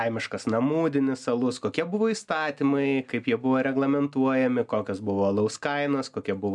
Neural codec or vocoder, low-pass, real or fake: none; 10.8 kHz; real